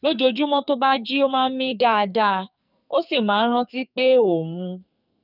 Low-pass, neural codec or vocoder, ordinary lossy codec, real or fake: 5.4 kHz; codec, 44.1 kHz, 2.6 kbps, SNAC; none; fake